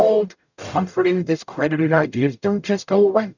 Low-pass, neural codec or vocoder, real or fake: 7.2 kHz; codec, 44.1 kHz, 0.9 kbps, DAC; fake